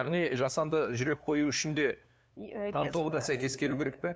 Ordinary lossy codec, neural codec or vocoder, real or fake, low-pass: none; codec, 16 kHz, 2 kbps, FunCodec, trained on LibriTTS, 25 frames a second; fake; none